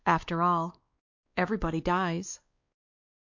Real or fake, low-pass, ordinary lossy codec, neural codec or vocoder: fake; 7.2 kHz; MP3, 48 kbps; codec, 16 kHz, 2 kbps, X-Codec, WavLM features, trained on Multilingual LibriSpeech